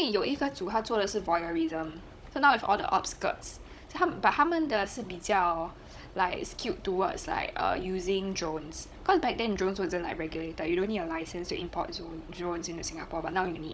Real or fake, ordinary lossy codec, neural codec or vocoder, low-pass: fake; none; codec, 16 kHz, 16 kbps, FunCodec, trained on Chinese and English, 50 frames a second; none